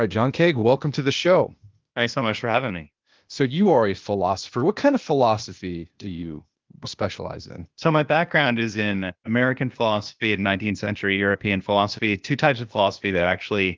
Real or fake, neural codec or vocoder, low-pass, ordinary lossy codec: fake; codec, 16 kHz, 0.8 kbps, ZipCodec; 7.2 kHz; Opus, 32 kbps